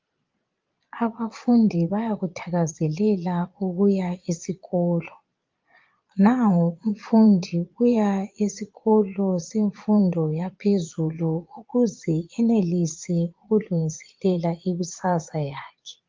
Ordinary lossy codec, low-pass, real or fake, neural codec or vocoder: Opus, 24 kbps; 7.2 kHz; fake; vocoder, 22.05 kHz, 80 mel bands, Vocos